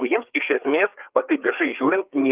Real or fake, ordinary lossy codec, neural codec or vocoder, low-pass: fake; Opus, 32 kbps; codec, 16 kHz, 4 kbps, FreqCodec, larger model; 3.6 kHz